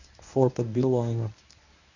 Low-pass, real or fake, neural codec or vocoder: 7.2 kHz; fake; codec, 24 kHz, 0.9 kbps, WavTokenizer, medium speech release version 1